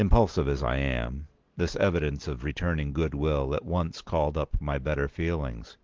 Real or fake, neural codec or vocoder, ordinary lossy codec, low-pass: real; none; Opus, 16 kbps; 7.2 kHz